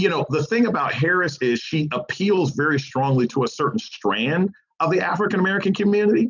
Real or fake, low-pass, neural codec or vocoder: real; 7.2 kHz; none